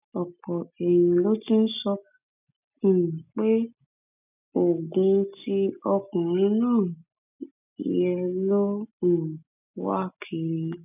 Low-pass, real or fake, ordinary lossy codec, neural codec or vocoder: 3.6 kHz; real; none; none